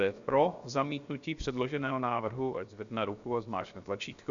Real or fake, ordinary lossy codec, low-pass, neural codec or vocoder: fake; Opus, 64 kbps; 7.2 kHz; codec, 16 kHz, 0.7 kbps, FocalCodec